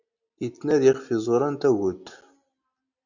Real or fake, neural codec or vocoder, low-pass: real; none; 7.2 kHz